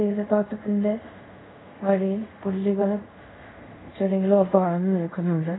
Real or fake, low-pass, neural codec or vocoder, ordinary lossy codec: fake; 7.2 kHz; codec, 24 kHz, 0.5 kbps, DualCodec; AAC, 16 kbps